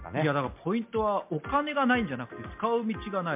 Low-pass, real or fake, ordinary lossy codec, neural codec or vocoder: 3.6 kHz; real; MP3, 32 kbps; none